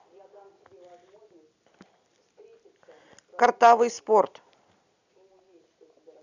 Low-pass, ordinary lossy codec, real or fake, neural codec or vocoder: 7.2 kHz; none; real; none